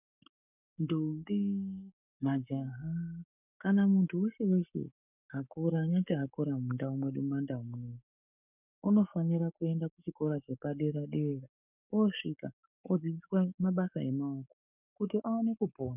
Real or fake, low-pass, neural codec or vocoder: fake; 3.6 kHz; codec, 44.1 kHz, 7.8 kbps, Pupu-Codec